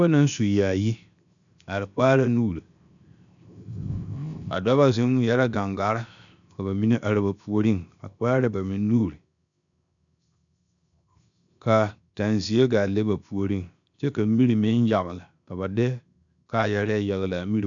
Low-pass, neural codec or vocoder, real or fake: 7.2 kHz; codec, 16 kHz, 0.7 kbps, FocalCodec; fake